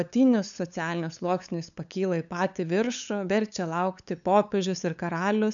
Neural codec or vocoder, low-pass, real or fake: codec, 16 kHz, 8 kbps, FunCodec, trained on LibriTTS, 25 frames a second; 7.2 kHz; fake